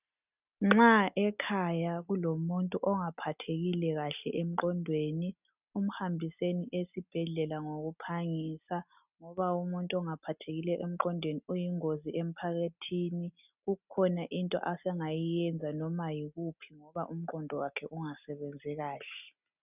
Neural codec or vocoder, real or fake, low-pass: none; real; 3.6 kHz